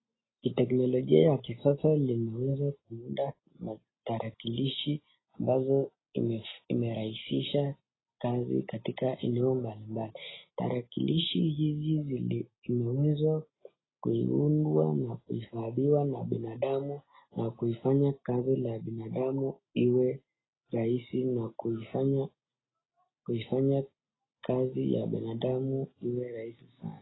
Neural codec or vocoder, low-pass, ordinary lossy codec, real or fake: none; 7.2 kHz; AAC, 16 kbps; real